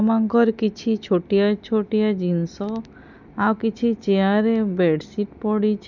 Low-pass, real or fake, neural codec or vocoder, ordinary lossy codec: 7.2 kHz; real; none; none